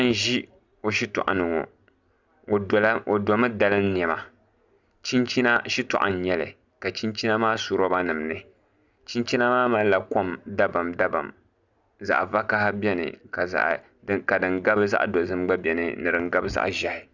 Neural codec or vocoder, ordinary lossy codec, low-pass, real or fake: none; Opus, 64 kbps; 7.2 kHz; real